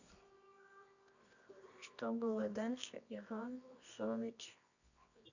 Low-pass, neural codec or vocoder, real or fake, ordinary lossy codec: 7.2 kHz; codec, 24 kHz, 0.9 kbps, WavTokenizer, medium music audio release; fake; none